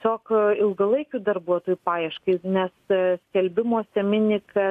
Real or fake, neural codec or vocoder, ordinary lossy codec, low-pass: real; none; AAC, 64 kbps; 14.4 kHz